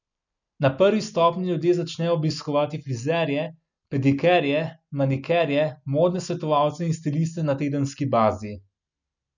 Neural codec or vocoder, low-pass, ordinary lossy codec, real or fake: none; 7.2 kHz; none; real